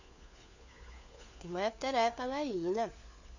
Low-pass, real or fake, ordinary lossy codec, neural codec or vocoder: 7.2 kHz; fake; none; codec, 16 kHz, 4 kbps, FunCodec, trained on LibriTTS, 50 frames a second